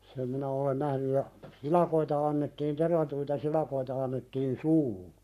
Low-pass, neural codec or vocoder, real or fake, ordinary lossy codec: 14.4 kHz; codec, 44.1 kHz, 7.8 kbps, Pupu-Codec; fake; none